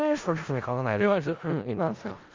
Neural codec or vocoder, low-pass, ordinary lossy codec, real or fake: codec, 16 kHz in and 24 kHz out, 0.4 kbps, LongCat-Audio-Codec, four codebook decoder; 7.2 kHz; Opus, 32 kbps; fake